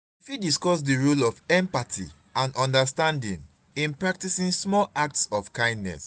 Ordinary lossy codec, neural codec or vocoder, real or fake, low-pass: none; none; real; none